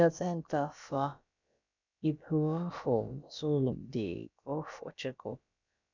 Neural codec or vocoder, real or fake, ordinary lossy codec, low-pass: codec, 16 kHz, about 1 kbps, DyCAST, with the encoder's durations; fake; none; 7.2 kHz